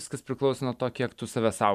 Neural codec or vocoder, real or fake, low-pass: vocoder, 44.1 kHz, 128 mel bands every 512 samples, BigVGAN v2; fake; 14.4 kHz